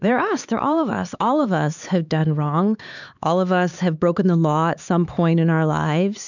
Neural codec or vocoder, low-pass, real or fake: codec, 16 kHz, 4 kbps, X-Codec, HuBERT features, trained on LibriSpeech; 7.2 kHz; fake